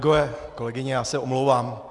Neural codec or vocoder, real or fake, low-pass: none; real; 10.8 kHz